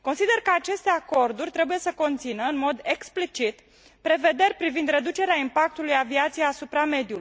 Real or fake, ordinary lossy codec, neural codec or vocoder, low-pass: real; none; none; none